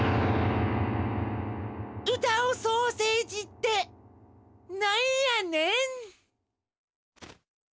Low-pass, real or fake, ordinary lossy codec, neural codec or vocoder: none; real; none; none